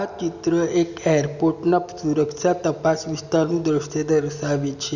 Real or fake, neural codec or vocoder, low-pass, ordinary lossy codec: real; none; 7.2 kHz; none